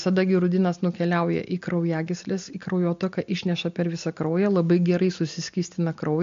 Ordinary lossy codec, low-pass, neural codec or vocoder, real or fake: MP3, 48 kbps; 7.2 kHz; none; real